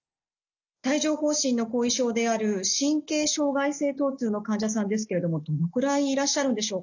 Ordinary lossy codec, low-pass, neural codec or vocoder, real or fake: none; 7.2 kHz; none; real